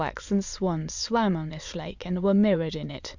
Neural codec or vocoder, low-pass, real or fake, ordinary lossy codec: autoencoder, 22.05 kHz, a latent of 192 numbers a frame, VITS, trained on many speakers; 7.2 kHz; fake; Opus, 64 kbps